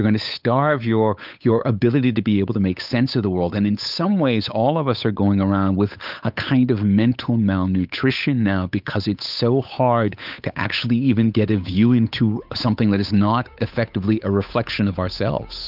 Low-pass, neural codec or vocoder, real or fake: 5.4 kHz; codec, 16 kHz, 8 kbps, FunCodec, trained on Chinese and English, 25 frames a second; fake